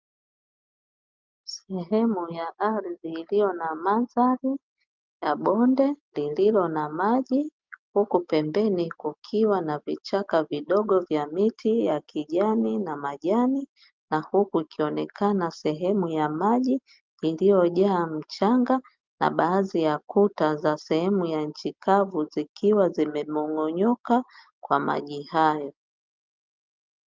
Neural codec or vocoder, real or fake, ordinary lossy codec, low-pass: none; real; Opus, 24 kbps; 7.2 kHz